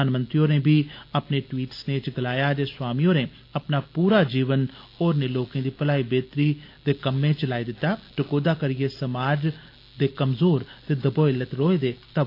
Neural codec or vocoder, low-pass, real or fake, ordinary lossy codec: none; 5.4 kHz; real; AAC, 32 kbps